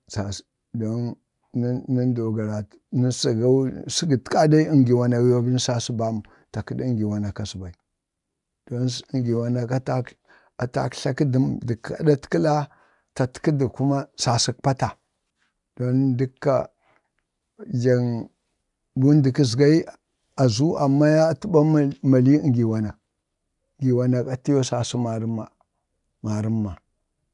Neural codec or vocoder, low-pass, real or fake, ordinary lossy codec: none; 10.8 kHz; real; none